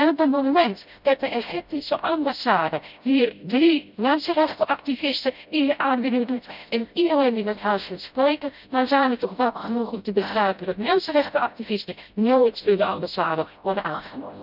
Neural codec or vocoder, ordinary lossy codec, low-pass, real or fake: codec, 16 kHz, 0.5 kbps, FreqCodec, smaller model; none; 5.4 kHz; fake